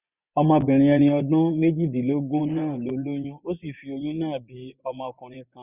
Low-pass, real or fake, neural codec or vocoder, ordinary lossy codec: 3.6 kHz; fake; vocoder, 24 kHz, 100 mel bands, Vocos; none